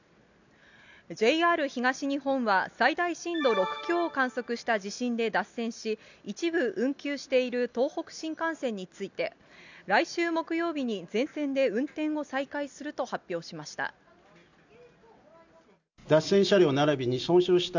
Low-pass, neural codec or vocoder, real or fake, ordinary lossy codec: 7.2 kHz; none; real; none